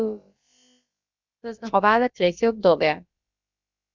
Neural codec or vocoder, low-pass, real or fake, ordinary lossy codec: codec, 16 kHz, about 1 kbps, DyCAST, with the encoder's durations; 7.2 kHz; fake; Opus, 64 kbps